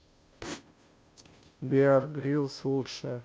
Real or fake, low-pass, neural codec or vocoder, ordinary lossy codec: fake; none; codec, 16 kHz, 0.5 kbps, FunCodec, trained on Chinese and English, 25 frames a second; none